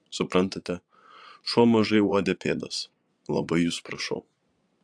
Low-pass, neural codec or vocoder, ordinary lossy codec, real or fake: 9.9 kHz; vocoder, 22.05 kHz, 80 mel bands, Vocos; AAC, 64 kbps; fake